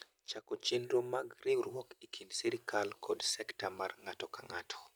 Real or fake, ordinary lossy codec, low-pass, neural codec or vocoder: real; none; none; none